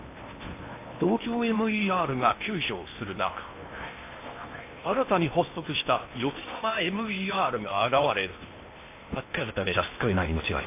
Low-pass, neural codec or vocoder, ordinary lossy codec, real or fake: 3.6 kHz; codec, 16 kHz in and 24 kHz out, 0.8 kbps, FocalCodec, streaming, 65536 codes; AAC, 24 kbps; fake